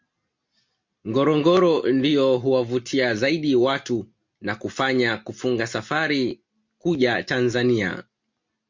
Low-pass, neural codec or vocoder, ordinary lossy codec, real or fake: 7.2 kHz; none; MP3, 48 kbps; real